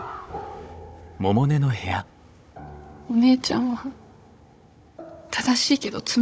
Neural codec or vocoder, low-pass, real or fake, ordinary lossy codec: codec, 16 kHz, 16 kbps, FunCodec, trained on Chinese and English, 50 frames a second; none; fake; none